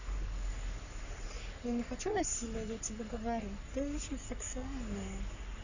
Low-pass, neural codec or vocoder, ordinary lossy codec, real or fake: 7.2 kHz; codec, 44.1 kHz, 3.4 kbps, Pupu-Codec; none; fake